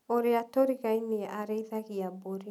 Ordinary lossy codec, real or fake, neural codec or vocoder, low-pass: none; real; none; 19.8 kHz